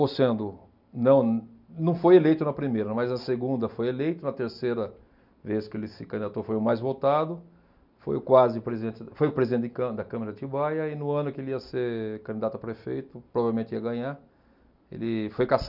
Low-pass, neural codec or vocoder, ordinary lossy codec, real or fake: 5.4 kHz; none; none; real